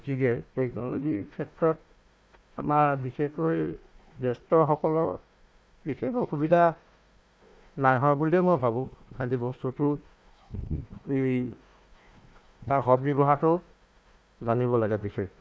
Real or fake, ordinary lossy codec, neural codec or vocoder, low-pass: fake; none; codec, 16 kHz, 1 kbps, FunCodec, trained on Chinese and English, 50 frames a second; none